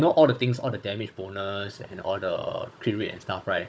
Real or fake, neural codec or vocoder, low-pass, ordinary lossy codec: fake; codec, 16 kHz, 16 kbps, FunCodec, trained on Chinese and English, 50 frames a second; none; none